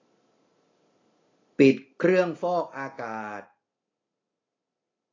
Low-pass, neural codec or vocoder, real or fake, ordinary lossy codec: 7.2 kHz; none; real; AAC, 32 kbps